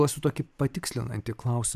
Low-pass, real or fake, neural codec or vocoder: 14.4 kHz; fake; vocoder, 44.1 kHz, 128 mel bands every 512 samples, BigVGAN v2